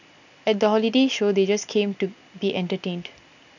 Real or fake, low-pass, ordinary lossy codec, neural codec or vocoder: real; 7.2 kHz; none; none